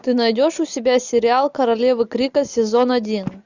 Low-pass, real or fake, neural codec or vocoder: 7.2 kHz; real; none